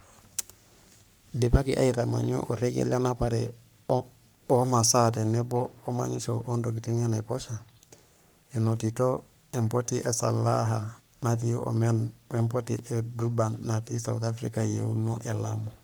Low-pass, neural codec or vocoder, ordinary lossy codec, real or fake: none; codec, 44.1 kHz, 3.4 kbps, Pupu-Codec; none; fake